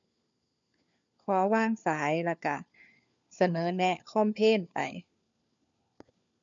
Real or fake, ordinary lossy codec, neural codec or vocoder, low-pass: fake; MP3, 64 kbps; codec, 16 kHz, 4 kbps, FunCodec, trained on LibriTTS, 50 frames a second; 7.2 kHz